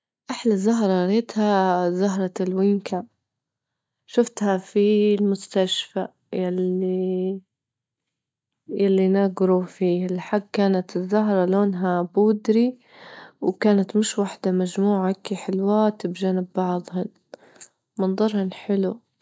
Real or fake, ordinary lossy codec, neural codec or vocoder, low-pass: real; none; none; none